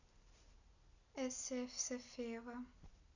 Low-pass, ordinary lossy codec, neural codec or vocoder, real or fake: 7.2 kHz; none; none; real